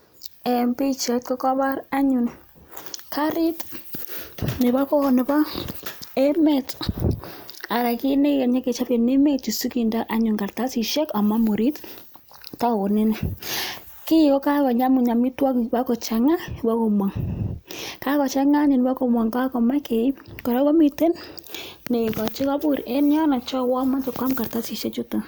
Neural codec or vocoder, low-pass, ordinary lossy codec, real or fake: none; none; none; real